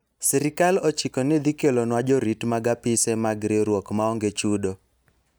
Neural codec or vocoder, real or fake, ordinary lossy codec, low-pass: none; real; none; none